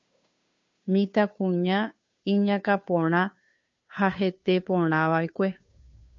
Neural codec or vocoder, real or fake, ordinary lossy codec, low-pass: codec, 16 kHz, 2 kbps, FunCodec, trained on Chinese and English, 25 frames a second; fake; MP3, 48 kbps; 7.2 kHz